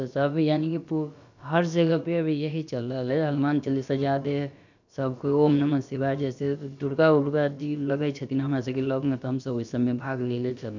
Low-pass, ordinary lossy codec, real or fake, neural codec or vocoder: 7.2 kHz; none; fake; codec, 16 kHz, about 1 kbps, DyCAST, with the encoder's durations